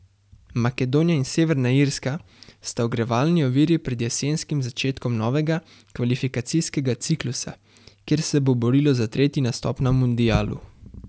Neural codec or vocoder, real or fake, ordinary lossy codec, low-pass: none; real; none; none